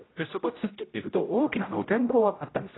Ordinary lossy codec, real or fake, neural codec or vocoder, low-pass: AAC, 16 kbps; fake; codec, 16 kHz, 0.5 kbps, X-Codec, HuBERT features, trained on general audio; 7.2 kHz